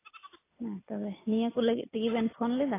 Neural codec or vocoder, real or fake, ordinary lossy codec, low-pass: none; real; AAC, 16 kbps; 3.6 kHz